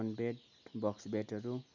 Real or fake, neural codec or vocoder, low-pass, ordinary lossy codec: real; none; 7.2 kHz; none